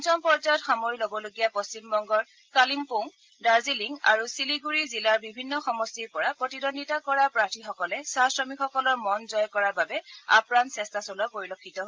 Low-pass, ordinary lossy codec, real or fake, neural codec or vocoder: 7.2 kHz; Opus, 32 kbps; real; none